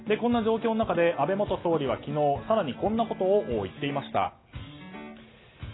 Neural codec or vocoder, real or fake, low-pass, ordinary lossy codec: none; real; 7.2 kHz; AAC, 16 kbps